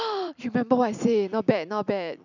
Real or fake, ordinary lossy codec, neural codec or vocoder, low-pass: fake; none; vocoder, 44.1 kHz, 128 mel bands every 256 samples, BigVGAN v2; 7.2 kHz